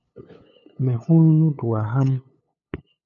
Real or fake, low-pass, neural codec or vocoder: fake; 7.2 kHz; codec, 16 kHz, 8 kbps, FunCodec, trained on LibriTTS, 25 frames a second